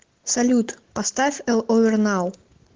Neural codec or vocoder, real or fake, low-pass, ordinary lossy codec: none; real; 7.2 kHz; Opus, 16 kbps